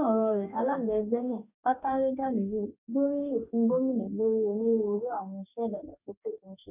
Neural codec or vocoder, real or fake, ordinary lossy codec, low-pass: codec, 44.1 kHz, 2.6 kbps, DAC; fake; none; 3.6 kHz